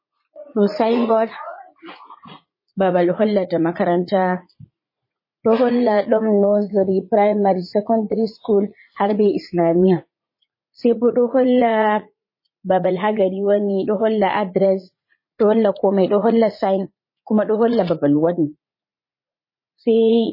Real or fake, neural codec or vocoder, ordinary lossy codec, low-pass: fake; vocoder, 44.1 kHz, 80 mel bands, Vocos; MP3, 24 kbps; 5.4 kHz